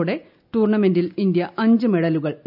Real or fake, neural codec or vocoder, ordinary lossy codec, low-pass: real; none; none; 5.4 kHz